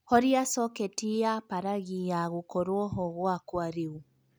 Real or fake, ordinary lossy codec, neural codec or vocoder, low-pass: real; none; none; none